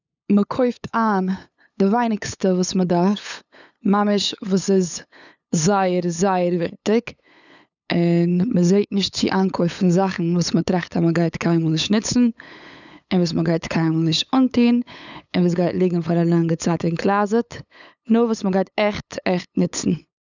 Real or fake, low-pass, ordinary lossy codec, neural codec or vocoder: fake; 7.2 kHz; none; codec, 16 kHz, 8 kbps, FunCodec, trained on LibriTTS, 25 frames a second